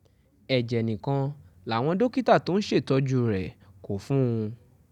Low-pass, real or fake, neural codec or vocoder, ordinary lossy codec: 19.8 kHz; real; none; none